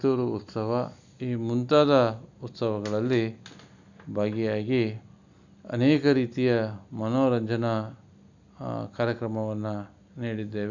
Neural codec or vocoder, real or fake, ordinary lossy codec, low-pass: none; real; none; 7.2 kHz